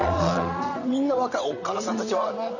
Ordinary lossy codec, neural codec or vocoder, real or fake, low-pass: none; vocoder, 22.05 kHz, 80 mel bands, WaveNeXt; fake; 7.2 kHz